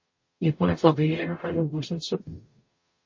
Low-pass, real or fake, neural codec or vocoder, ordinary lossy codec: 7.2 kHz; fake; codec, 44.1 kHz, 0.9 kbps, DAC; MP3, 32 kbps